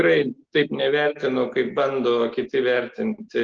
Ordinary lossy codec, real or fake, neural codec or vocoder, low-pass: Opus, 16 kbps; real; none; 7.2 kHz